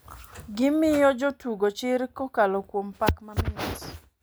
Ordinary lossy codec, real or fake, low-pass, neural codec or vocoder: none; real; none; none